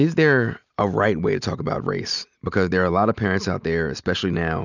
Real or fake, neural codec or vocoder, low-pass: real; none; 7.2 kHz